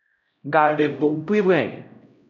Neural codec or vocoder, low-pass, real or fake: codec, 16 kHz, 0.5 kbps, X-Codec, HuBERT features, trained on LibriSpeech; 7.2 kHz; fake